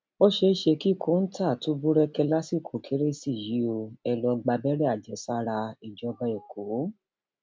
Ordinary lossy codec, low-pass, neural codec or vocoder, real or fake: none; none; none; real